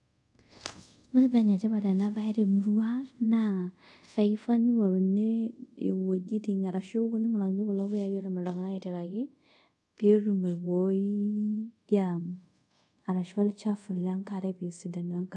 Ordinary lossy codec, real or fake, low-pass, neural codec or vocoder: none; fake; 10.8 kHz; codec, 24 kHz, 0.5 kbps, DualCodec